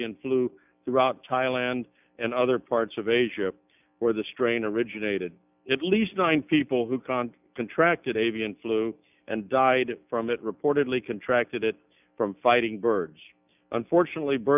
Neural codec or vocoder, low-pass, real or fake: none; 3.6 kHz; real